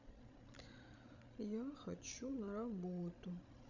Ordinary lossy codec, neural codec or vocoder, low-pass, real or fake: none; codec, 16 kHz, 16 kbps, FreqCodec, larger model; 7.2 kHz; fake